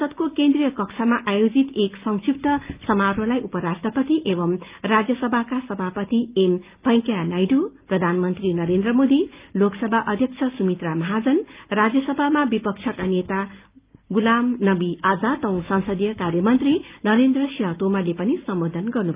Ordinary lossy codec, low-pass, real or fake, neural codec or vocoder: Opus, 24 kbps; 3.6 kHz; real; none